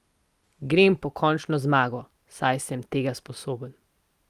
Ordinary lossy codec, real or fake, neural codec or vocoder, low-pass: Opus, 32 kbps; real; none; 14.4 kHz